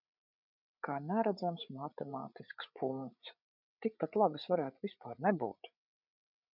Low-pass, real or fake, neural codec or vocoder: 5.4 kHz; fake; codec, 16 kHz, 8 kbps, FreqCodec, larger model